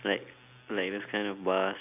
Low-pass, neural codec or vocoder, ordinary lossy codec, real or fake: 3.6 kHz; none; none; real